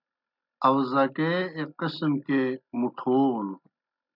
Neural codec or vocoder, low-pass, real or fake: none; 5.4 kHz; real